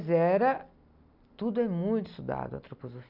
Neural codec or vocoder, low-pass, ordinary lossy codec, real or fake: none; 5.4 kHz; none; real